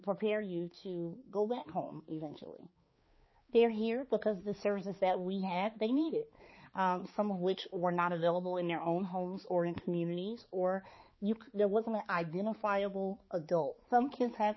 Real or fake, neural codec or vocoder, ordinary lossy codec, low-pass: fake; codec, 16 kHz, 4 kbps, X-Codec, HuBERT features, trained on balanced general audio; MP3, 24 kbps; 7.2 kHz